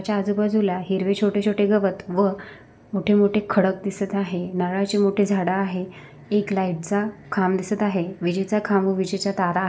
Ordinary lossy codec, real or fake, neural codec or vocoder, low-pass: none; real; none; none